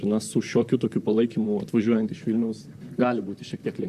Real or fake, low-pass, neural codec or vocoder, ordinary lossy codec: fake; 14.4 kHz; vocoder, 44.1 kHz, 128 mel bands, Pupu-Vocoder; Opus, 64 kbps